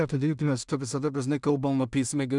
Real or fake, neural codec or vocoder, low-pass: fake; codec, 16 kHz in and 24 kHz out, 0.4 kbps, LongCat-Audio-Codec, two codebook decoder; 10.8 kHz